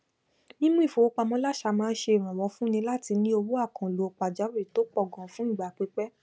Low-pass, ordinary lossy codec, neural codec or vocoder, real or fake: none; none; none; real